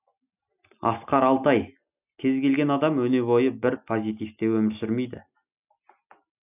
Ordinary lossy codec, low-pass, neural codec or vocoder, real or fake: none; 3.6 kHz; none; real